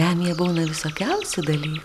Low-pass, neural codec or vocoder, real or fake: 14.4 kHz; none; real